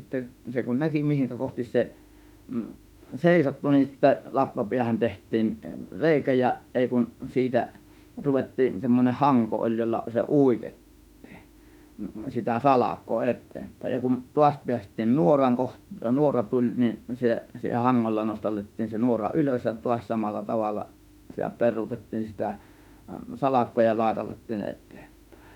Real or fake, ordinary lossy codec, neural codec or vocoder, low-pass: fake; none; autoencoder, 48 kHz, 32 numbers a frame, DAC-VAE, trained on Japanese speech; 19.8 kHz